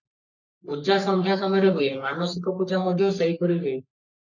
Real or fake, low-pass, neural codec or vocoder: fake; 7.2 kHz; codec, 44.1 kHz, 3.4 kbps, Pupu-Codec